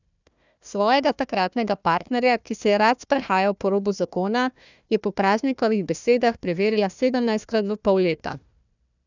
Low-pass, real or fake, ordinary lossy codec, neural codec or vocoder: 7.2 kHz; fake; none; codec, 16 kHz, 1 kbps, FunCodec, trained on Chinese and English, 50 frames a second